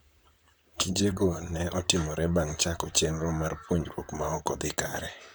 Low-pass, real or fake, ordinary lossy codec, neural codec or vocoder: none; fake; none; vocoder, 44.1 kHz, 128 mel bands, Pupu-Vocoder